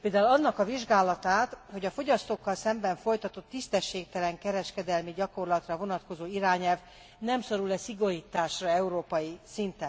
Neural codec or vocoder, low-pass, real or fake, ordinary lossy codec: none; none; real; none